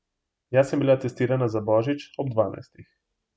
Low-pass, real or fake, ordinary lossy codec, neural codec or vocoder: none; real; none; none